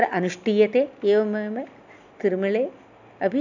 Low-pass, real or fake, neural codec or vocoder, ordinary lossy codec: 7.2 kHz; real; none; none